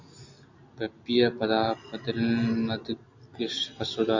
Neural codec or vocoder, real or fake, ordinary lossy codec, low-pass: none; real; MP3, 48 kbps; 7.2 kHz